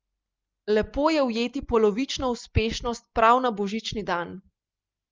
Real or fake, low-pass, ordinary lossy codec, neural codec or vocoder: real; 7.2 kHz; Opus, 32 kbps; none